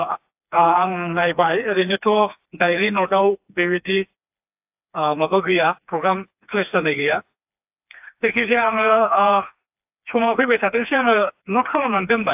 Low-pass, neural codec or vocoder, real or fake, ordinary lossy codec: 3.6 kHz; codec, 16 kHz, 2 kbps, FreqCodec, smaller model; fake; AAC, 32 kbps